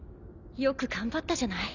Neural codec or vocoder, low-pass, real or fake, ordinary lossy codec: none; 7.2 kHz; real; none